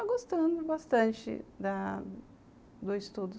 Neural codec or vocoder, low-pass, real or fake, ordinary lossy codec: none; none; real; none